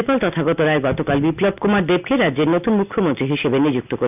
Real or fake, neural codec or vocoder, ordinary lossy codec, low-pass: real; none; none; 3.6 kHz